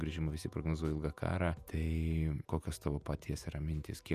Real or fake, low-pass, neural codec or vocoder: fake; 14.4 kHz; vocoder, 48 kHz, 128 mel bands, Vocos